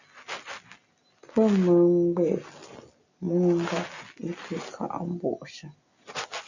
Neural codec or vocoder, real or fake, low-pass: none; real; 7.2 kHz